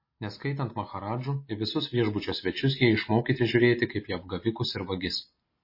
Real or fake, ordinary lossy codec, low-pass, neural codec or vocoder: real; MP3, 24 kbps; 5.4 kHz; none